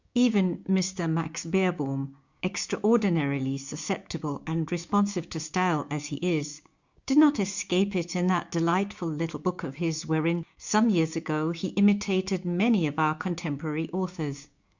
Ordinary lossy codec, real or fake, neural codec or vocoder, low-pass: Opus, 64 kbps; fake; codec, 16 kHz, 6 kbps, DAC; 7.2 kHz